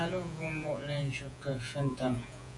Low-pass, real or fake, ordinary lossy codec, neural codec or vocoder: 10.8 kHz; fake; AAC, 64 kbps; vocoder, 48 kHz, 128 mel bands, Vocos